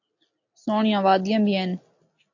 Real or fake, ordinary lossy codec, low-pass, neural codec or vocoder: real; AAC, 48 kbps; 7.2 kHz; none